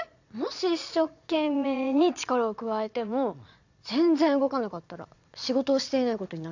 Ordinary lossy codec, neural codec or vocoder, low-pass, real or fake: AAC, 48 kbps; vocoder, 22.05 kHz, 80 mel bands, Vocos; 7.2 kHz; fake